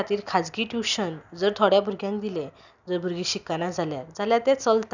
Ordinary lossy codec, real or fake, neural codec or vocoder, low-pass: none; real; none; 7.2 kHz